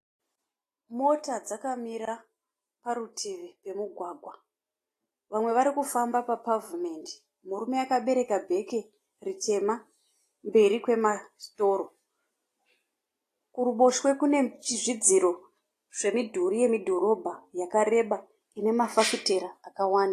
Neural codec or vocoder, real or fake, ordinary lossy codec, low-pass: none; real; AAC, 48 kbps; 14.4 kHz